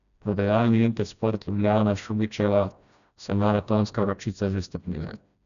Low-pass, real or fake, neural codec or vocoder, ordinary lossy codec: 7.2 kHz; fake; codec, 16 kHz, 1 kbps, FreqCodec, smaller model; none